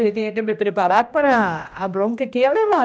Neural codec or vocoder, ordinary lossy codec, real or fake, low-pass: codec, 16 kHz, 1 kbps, X-Codec, HuBERT features, trained on general audio; none; fake; none